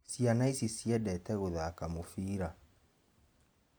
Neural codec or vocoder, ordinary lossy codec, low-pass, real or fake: none; none; none; real